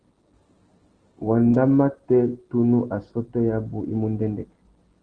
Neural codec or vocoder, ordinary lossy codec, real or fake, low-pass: none; Opus, 16 kbps; real; 9.9 kHz